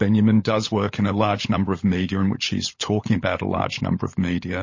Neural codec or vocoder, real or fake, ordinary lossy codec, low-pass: codec, 16 kHz, 16 kbps, FunCodec, trained on LibriTTS, 50 frames a second; fake; MP3, 32 kbps; 7.2 kHz